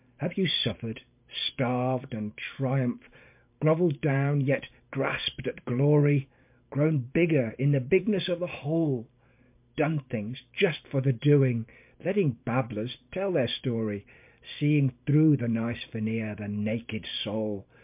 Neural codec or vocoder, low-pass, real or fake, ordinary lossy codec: vocoder, 44.1 kHz, 128 mel bands every 512 samples, BigVGAN v2; 3.6 kHz; fake; MP3, 32 kbps